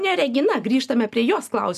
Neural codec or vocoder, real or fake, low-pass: none; real; 14.4 kHz